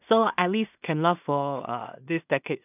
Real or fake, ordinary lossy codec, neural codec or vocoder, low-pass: fake; none; codec, 16 kHz in and 24 kHz out, 0.4 kbps, LongCat-Audio-Codec, two codebook decoder; 3.6 kHz